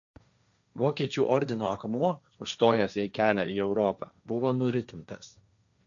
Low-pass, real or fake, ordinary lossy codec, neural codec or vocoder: 7.2 kHz; fake; MP3, 96 kbps; codec, 16 kHz, 1.1 kbps, Voila-Tokenizer